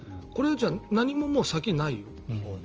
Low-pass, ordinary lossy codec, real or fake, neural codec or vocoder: 7.2 kHz; Opus, 24 kbps; real; none